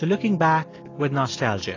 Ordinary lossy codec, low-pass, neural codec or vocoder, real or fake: AAC, 32 kbps; 7.2 kHz; none; real